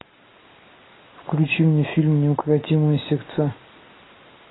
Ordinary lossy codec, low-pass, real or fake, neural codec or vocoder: AAC, 16 kbps; 7.2 kHz; real; none